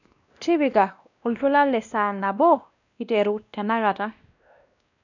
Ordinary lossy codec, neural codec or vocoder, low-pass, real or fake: none; codec, 16 kHz, 1 kbps, X-Codec, WavLM features, trained on Multilingual LibriSpeech; 7.2 kHz; fake